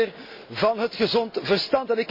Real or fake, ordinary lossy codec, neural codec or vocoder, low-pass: real; MP3, 32 kbps; none; 5.4 kHz